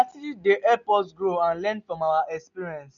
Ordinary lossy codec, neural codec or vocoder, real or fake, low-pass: none; none; real; 7.2 kHz